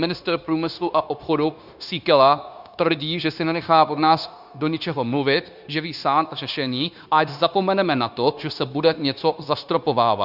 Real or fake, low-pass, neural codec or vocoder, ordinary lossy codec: fake; 5.4 kHz; codec, 16 kHz, 0.9 kbps, LongCat-Audio-Codec; Opus, 64 kbps